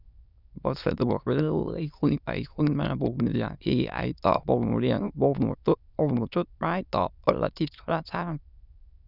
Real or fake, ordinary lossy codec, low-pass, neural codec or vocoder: fake; none; 5.4 kHz; autoencoder, 22.05 kHz, a latent of 192 numbers a frame, VITS, trained on many speakers